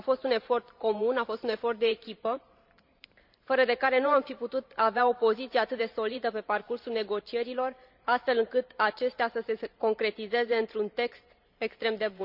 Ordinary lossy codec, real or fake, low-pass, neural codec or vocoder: none; fake; 5.4 kHz; vocoder, 44.1 kHz, 128 mel bands every 512 samples, BigVGAN v2